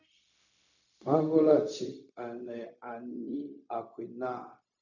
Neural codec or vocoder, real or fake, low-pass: codec, 16 kHz, 0.4 kbps, LongCat-Audio-Codec; fake; 7.2 kHz